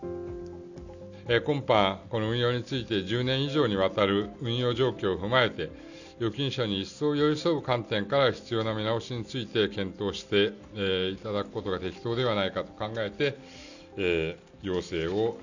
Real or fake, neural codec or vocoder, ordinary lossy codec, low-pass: real; none; none; 7.2 kHz